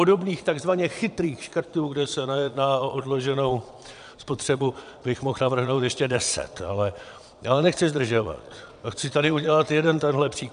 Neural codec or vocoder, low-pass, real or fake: vocoder, 22.05 kHz, 80 mel bands, Vocos; 9.9 kHz; fake